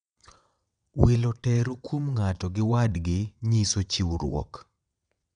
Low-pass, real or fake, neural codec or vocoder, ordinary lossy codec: 9.9 kHz; fake; vocoder, 22.05 kHz, 80 mel bands, WaveNeXt; none